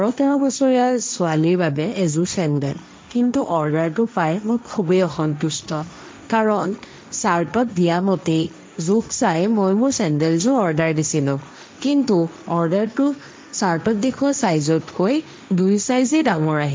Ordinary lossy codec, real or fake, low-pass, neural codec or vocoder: none; fake; none; codec, 16 kHz, 1.1 kbps, Voila-Tokenizer